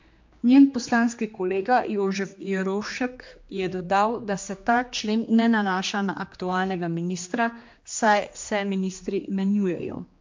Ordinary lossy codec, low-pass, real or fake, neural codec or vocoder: MP3, 48 kbps; 7.2 kHz; fake; codec, 16 kHz, 2 kbps, X-Codec, HuBERT features, trained on general audio